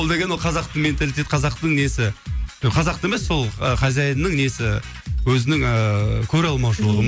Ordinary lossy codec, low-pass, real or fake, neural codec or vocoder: none; none; real; none